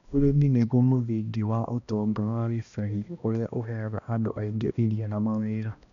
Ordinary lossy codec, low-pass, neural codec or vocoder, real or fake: none; 7.2 kHz; codec, 16 kHz, 1 kbps, X-Codec, HuBERT features, trained on balanced general audio; fake